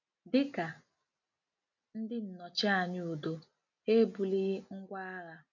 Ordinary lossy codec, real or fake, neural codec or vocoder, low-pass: none; real; none; 7.2 kHz